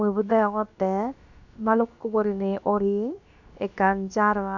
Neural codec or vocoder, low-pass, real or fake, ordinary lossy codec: codec, 16 kHz, about 1 kbps, DyCAST, with the encoder's durations; 7.2 kHz; fake; none